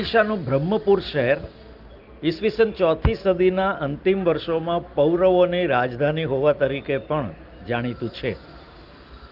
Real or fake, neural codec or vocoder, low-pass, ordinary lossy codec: real; none; 5.4 kHz; Opus, 32 kbps